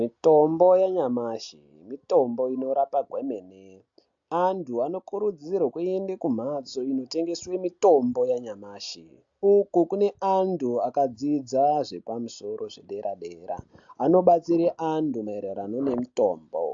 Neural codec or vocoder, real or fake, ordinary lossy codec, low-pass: none; real; AAC, 64 kbps; 7.2 kHz